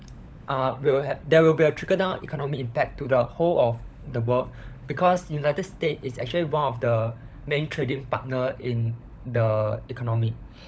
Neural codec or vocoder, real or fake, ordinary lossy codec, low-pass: codec, 16 kHz, 16 kbps, FunCodec, trained on LibriTTS, 50 frames a second; fake; none; none